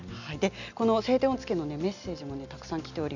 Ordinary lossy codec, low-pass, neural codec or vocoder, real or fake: none; 7.2 kHz; none; real